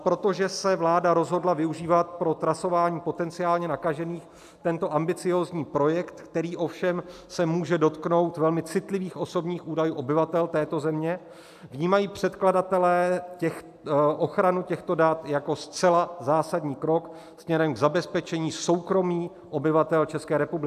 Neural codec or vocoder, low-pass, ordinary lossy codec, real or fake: none; 14.4 kHz; AAC, 96 kbps; real